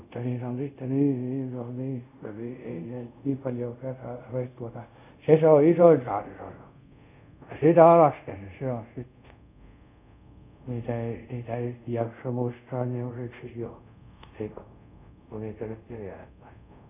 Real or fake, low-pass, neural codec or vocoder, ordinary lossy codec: fake; 3.6 kHz; codec, 24 kHz, 0.5 kbps, DualCodec; none